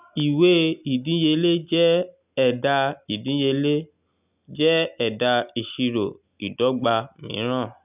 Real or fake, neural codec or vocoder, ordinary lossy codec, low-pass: real; none; none; 3.6 kHz